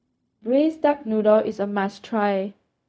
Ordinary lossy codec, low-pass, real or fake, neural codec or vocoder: none; none; fake; codec, 16 kHz, 0.4 kbps, LongCat-Audio-Codec